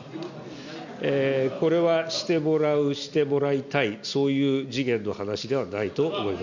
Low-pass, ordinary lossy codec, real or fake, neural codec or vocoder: 7.2 kHz; none; real; none